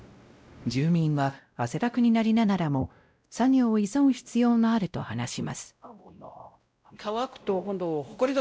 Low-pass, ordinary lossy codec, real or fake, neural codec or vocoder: none; none; fake; codec, 16 kHz, 0.5 kbps, X-Codec, WavLM features, trained on Multilingual LibriSpeech